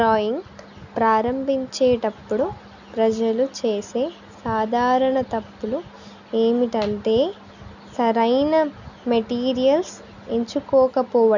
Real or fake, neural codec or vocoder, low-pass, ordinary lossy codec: real; none; 7.2 kHz; none